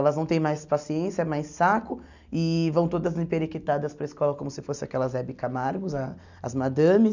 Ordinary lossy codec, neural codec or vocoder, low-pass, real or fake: none; none; 7.2 kHz; real